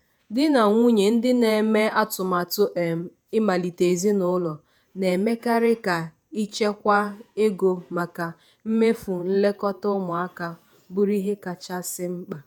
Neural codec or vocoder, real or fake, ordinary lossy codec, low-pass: vocoder, 48 kHz, 128 mel bands, Vocos; fake; none; none